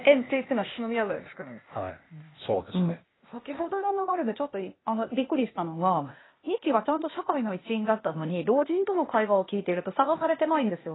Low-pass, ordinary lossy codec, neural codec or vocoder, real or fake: 7.2 kHz; AAC, 16 kbps; codec, 16 kHz, 0.8 kbps, ZipCodec; fake